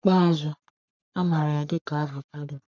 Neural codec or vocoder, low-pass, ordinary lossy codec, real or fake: codec, 44.1 kHz, 3.4 kbps, Pupu-Codec; 7.2 kHz; none; fake